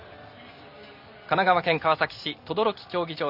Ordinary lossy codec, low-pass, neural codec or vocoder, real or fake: none; 5.4 kHz; none; real